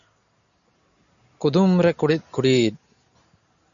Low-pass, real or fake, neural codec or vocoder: 7.2 kHz; real; none